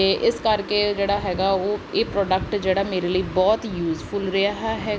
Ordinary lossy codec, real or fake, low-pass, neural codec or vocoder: none; real; none; none